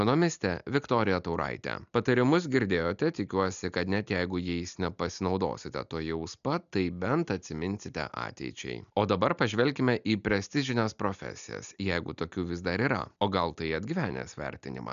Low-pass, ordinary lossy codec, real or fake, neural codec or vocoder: 7.2 kHz; MP3, 96 kbps; real; none